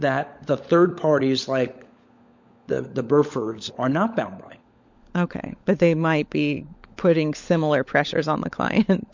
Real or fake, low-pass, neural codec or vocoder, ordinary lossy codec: fake; 7.2 kHz; codec, 16 kHz, 16 kbps, FunCodec, trained on LibriTTS, 50 frames a second; MP3, 48 kbps